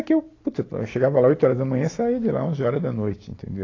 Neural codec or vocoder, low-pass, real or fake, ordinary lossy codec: none; 7.2 kHz; real; AAC, 32 kbps